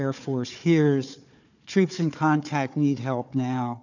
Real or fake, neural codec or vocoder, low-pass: fake; codec, 16 kHz, 4 kbps, FunCodec, trained on Chinese and English, 50 frames a second; 7.2 kHz